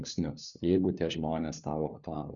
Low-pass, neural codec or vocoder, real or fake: 7.2 kHz; codec, 16 kHz, 4 kbps, FunCodec, trained on LibriTTS, 50 frames a second; fake